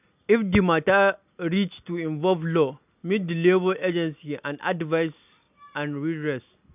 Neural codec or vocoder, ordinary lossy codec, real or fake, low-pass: none; none; real; 3.6 kHz